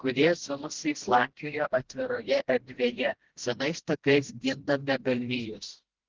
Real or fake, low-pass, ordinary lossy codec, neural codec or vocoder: fake; 7.2 kHz; Opus, 16 kbps; codec, 16 kHz, 1 kbps, FreqCodec, smaller model